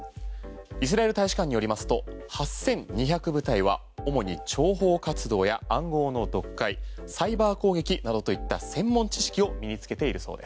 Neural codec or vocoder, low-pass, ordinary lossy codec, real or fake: none; none; none; real